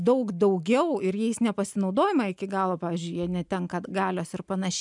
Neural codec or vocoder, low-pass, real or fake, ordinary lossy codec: none; 10.8 kHz; real; MP3, 96 kbps